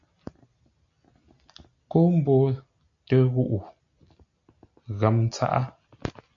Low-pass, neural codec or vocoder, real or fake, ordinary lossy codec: 7.2 kHz; none; real; AAC, 64 kbps